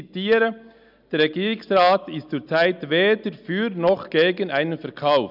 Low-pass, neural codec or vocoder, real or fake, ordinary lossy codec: 5.4 kHz; none; real; none